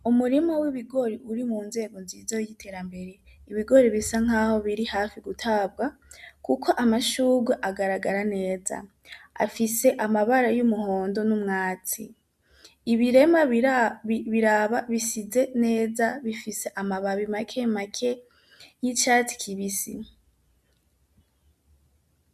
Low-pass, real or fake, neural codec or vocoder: 14.4 kHz; real; none